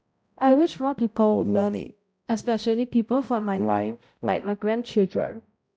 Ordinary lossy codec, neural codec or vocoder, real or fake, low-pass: none; codec, 16 kHz, 0.5 kbps, X-Codec, HuBERT features, trained on balanced general audio; fake; none